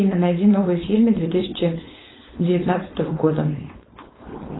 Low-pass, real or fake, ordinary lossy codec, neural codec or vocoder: 7.2 kHz; fake; AAC, 16 kbps; codec, 16 kHz, 4.8 kbps, FACodec